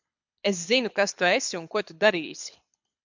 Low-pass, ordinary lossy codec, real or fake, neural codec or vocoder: 7.2 kHz; MP3, 64 kbps; fake; codec, 24 kHz, 6 kbps, HILCodec